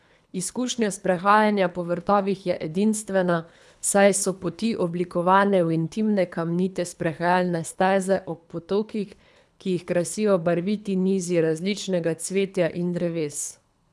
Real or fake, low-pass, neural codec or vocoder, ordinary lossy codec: fake; none; codec, 24 kHz, 3 kbps, HILCodec; none